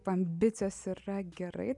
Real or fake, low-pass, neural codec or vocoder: real; 10.8 kHz; none